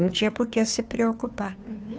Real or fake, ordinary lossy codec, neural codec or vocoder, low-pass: fake; none; codec, 16 kHz, 2 kbps, FunCodec, trained on Chinese and English, 25 frames a second; none